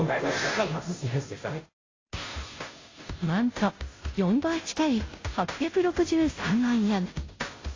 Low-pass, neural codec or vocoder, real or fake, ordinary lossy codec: 7.2 kHz; codec, 16 kHz, 0.5 kbps, FunCodec, trained on Chinese and English, 25 frames a second; fake; AAC, 32 kbps